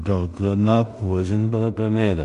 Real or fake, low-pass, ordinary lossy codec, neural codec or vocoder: fake; 10.8 kHz; MP3, 64 kbps; codec, 16 kHz in and 24 kHz out, 0.4 kbps, LongCat-Audio-Codec, two codebook decoder